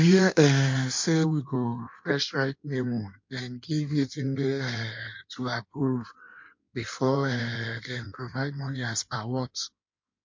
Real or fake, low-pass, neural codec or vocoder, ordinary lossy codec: fake; 7.2 kHz; codec, 16 kHz in and 24 kHz out, 1.1 kbps, FireRedTTS-2 codec; MP3, 48 kbps